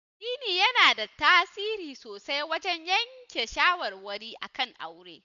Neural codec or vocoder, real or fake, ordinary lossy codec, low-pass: none; real; none; 7.2 kHz